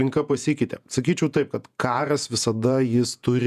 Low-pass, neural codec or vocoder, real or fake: 14.4 kHz; vocoder, 48 kHz, 128 mel bands, Vocos; fake